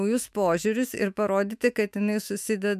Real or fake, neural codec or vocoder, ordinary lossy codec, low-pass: fake; autoencoder, 48 kHz, 128 numbers a frame, DAC-VAE, trained on Japanese speech; MP3, 96 kbps; 14.4 kHz